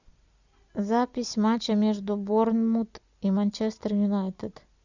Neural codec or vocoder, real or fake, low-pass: none; real; 7.2 kHz